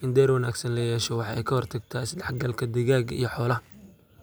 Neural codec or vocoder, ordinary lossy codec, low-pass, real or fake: none; none; none; real